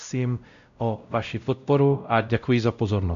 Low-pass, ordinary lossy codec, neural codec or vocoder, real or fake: 7.2 kHz; AAC, 96 kbps; codec, 16 kHz, 0.5 kbps, X-Codec, WavLM features, trained on Multilingual LibriSpeech; fake